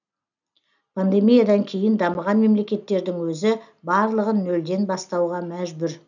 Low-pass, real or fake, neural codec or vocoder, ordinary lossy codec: 7.2 kHz; real; none; none